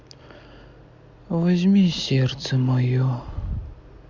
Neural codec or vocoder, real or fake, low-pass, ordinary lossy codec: none; real; 7.2 kHz; Opus, 64 kbps